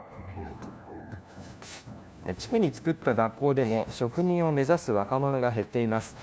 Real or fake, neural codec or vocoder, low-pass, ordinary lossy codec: fake; codec, 16 kHz, 1 kbps, FunCodec, trained on LibriTTS, 50 frames a second; none; none